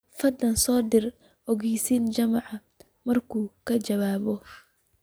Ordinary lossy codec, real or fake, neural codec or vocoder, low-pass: none; real; none; none